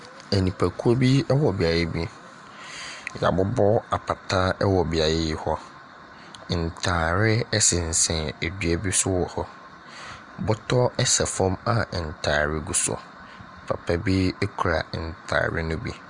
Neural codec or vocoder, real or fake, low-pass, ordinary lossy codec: none; real; 10.8 kHz; Opus, 64 kbps